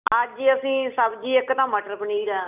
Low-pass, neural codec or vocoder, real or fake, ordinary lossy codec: 3.6 kHz; none; real; none